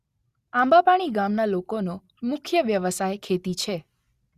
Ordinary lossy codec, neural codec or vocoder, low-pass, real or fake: Opus, 64 kbps; none; 14.4 kHz; real